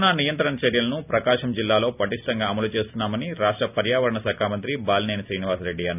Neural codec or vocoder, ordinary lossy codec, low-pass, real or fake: none; none; 3.6 kHz; real